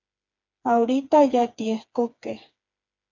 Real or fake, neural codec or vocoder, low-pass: fake; codec, 16 kHz, 4 kbps, FreqCodec, smaller model; 7.2 kHz